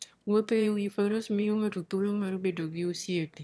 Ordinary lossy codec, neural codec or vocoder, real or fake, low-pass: none; autoencoder, 22.05 kHz, a latent of 192 numbers a frame, VITS, trained on one speaker; fake; none